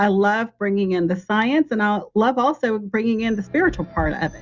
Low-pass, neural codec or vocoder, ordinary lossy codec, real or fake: 7.2 kHz; none; Opus, 64 kbps; real